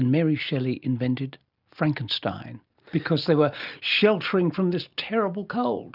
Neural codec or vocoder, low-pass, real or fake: none; 5.4 kHz; real